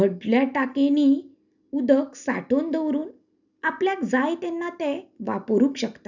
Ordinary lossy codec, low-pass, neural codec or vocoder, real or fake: none; 7.2 kHz; none; real